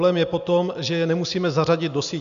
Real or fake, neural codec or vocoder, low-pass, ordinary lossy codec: real; none; 7.2 kHz; MP3, 96 kbps